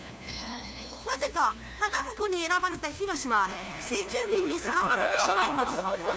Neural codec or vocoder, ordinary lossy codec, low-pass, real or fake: codec, 16 kHz, 1 kbps, FunCodec, trained on LibriTTS, 50 frames a second; none; none; fake